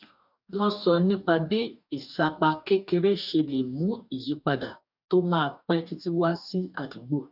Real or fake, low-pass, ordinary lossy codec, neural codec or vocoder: fake; 5.4 kHz; none; codec, 44.1 kHz, 2.6 kbps, DAC